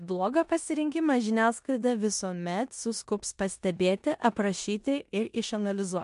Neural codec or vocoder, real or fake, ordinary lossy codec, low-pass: codec, 16 kHz in and 24 kHz out, 0.9 kbps, LongCat-Audio-Codec, four codebook decoder; fake; MP3, 64 kbps; 10.8 kHz